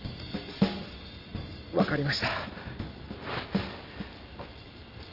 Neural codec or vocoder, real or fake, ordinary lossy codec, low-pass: none; real; Opus, 24 kbps; 5.4 kHz